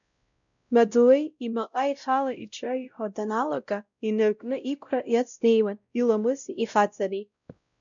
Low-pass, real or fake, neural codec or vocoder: 7.2 kHz; fake; codec, 16 kHz, 0.5 kbps, X-Codec, WavLM features, trained on Multilingual LibriSpeech